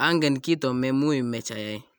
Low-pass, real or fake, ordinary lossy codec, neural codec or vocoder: none; real; none; none